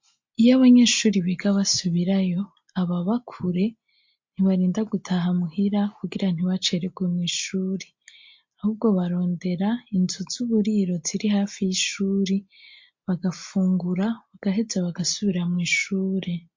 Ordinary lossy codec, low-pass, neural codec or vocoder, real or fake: MP3, 48 kbps; 7.2 kHz; none; real